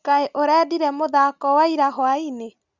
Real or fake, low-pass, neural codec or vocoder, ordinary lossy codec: real; 7.2 kHz; none; Opus, 64 kbps